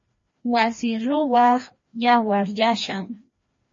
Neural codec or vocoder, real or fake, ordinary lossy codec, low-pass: codec, 16 kHz, 1 kbps, FreqCodec, larger model; fake; MP3, 32 kbps; 7.2 kHz